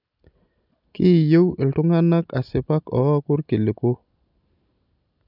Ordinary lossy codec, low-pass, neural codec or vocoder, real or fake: none; 5.4 kHz; none; real